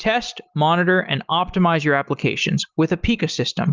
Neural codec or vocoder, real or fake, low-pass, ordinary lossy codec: none; real; 7.2 kHz; Opus, 32 kbps